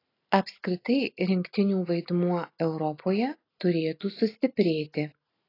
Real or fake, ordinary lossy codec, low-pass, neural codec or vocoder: real; AAC, 24 kbps; 5.4 kHz; none